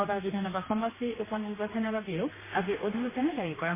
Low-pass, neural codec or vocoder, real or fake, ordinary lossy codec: 3.6 kHz; codec, 16 kHz, 2 kbps, X-Codec, HuBERT features, trained on general audio; fake; MP3, 16 kbps